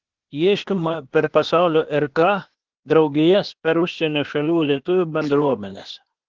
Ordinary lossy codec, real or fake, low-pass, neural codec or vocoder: Opus, 16 kbps; fake; 7.2 kHz; codec, 16 kHz, 0.8 kbps, ZipCodec